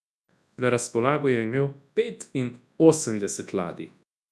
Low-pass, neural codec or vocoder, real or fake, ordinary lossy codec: none; codec, 24 kHz, 0.9 kbps, WavTokenizer, large speech release; fake; none